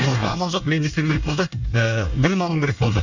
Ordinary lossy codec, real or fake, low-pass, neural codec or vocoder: none; fake; 7.2 kHz; codec, 24 kHz, 1 kbps, SNAC